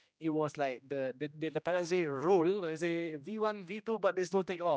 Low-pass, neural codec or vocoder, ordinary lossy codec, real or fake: none; codec, 16 kHz, 1 kbps, X-Codec, HuBERT features, trained on general audio; none; fake